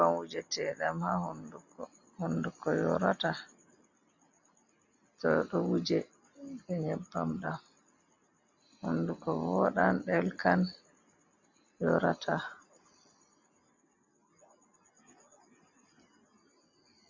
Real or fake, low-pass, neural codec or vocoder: real; 7.2 kHz; none